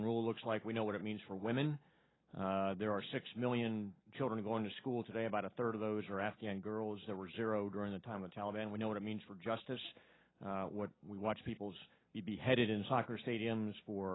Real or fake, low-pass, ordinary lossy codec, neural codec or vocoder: real; 7.2 kHz; AAC, 16 kbps; none